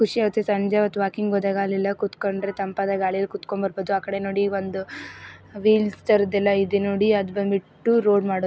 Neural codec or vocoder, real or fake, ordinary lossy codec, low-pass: none; real; none; none